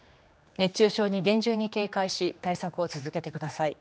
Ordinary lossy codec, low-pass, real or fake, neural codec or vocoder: none; none; fake; codec, 16 kHz, 2 kbps, X-Codec, HuBERT features, trained on general audio